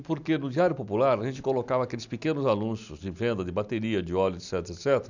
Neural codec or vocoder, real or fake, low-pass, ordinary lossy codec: none; real; 7.2 kHz; none